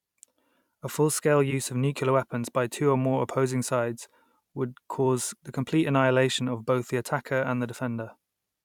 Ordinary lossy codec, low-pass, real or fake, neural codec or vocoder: none; 19.8 kHz; fake; vocoder, 44.1 kHz, 128 mel bands every 256 samples, BigVGAN v2